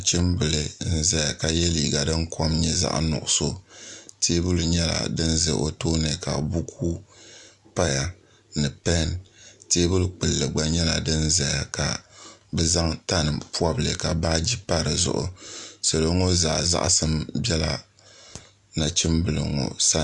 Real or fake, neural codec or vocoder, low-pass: real; none; 10.8 kHz